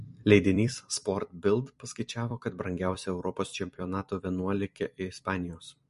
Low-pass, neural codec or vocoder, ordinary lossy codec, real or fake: 14.4 kHz; vocoder, 44.1 kHz, 128 mel bands every 256 samples, BigVGAN v2; MP3, 48 kbps; fake